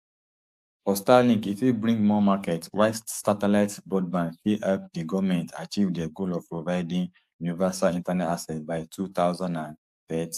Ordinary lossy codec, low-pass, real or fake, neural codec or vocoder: AAC, 96 kbps; 14.4 kHz; fake; codec, 44.1 kHz, 7.8 kbps, DAC